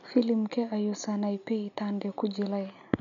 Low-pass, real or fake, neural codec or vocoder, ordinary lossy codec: 7.2 kHz; real; none; none